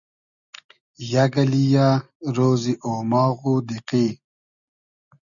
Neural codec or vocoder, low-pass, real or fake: none; 7.2 kHz; real